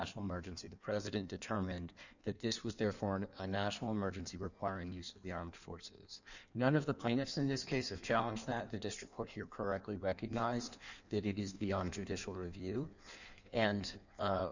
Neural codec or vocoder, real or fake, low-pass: codec, 16 kHz in and 24 kHz out, 1.1 kbps, FireRedTTS-2 codec; fake; 7.2 kHz